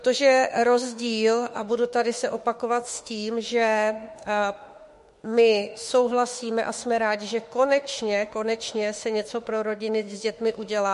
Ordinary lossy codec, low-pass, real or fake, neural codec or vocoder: MP3, 48 kbps; 14.4 kHz; fake; autoencoder, 48 kHz, 32 numbers a frame, DAC-VAE, trained on Japanese speech